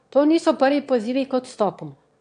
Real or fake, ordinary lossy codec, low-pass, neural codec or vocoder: fake; AAC, 64 kbps; 9.9 kHz; autoencoder, 22.05 kHz, a latent of 192 numbers a frame, VITS, trained on one speaker